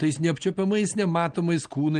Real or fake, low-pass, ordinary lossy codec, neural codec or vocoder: real; 9.9 kHz; Opus, 24 kbps; none